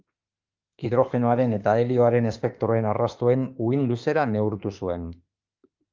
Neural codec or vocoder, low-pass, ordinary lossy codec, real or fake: autoencoder, 48 kHz, 32 numbers a frame, DAC-VAE, trained on Japanese speech; 7.2 kHz; Opus, 24 kbps; fake